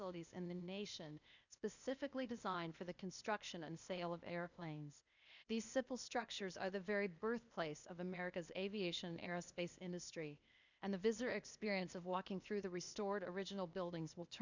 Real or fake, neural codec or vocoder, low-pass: fake; codec, 16 kHz, 0.8 kbps, ZipCodec; 7.2 kHz